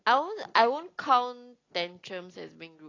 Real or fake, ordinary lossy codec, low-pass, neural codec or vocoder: real; AAC, 32 kbps; 7.2 kHz; none